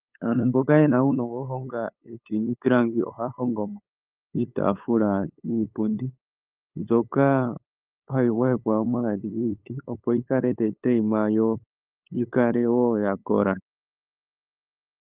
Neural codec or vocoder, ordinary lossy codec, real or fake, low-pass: codec, 16 kHz, 8 kbps, FunCodec, trained on LibriTTS, 25 frames a second; Opus, 24 kbps; fake; 3.6 kHz